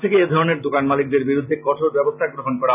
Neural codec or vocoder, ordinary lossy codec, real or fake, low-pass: none; none; real; 3.6 kHz